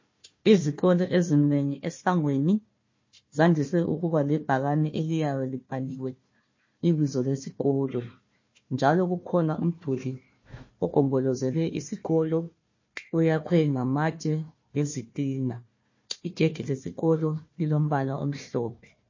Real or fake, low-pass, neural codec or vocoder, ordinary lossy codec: fake; 7.2 kHz; codec, 16 kHz, 1 kbps, FunCodec, trained on Chinese and English, 50 frames a second; MP3, 32 kbps